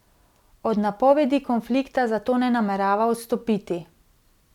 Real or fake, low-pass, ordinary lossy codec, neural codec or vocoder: real; 19.8 kHz; none; none